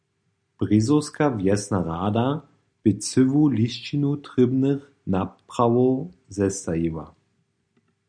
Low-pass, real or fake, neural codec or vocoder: 9.9 kHz; real; none